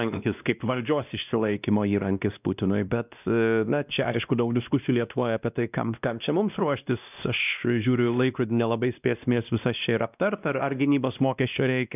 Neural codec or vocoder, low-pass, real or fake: codec, 16 kHz, 1 kbps, X-Codec, WavLM features, trained on Multilingual LibriSpeech; 3.6 kHz; fake